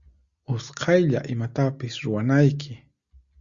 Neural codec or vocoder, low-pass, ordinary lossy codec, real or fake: none; 7.2 kHz; Opus, 64 kbps; real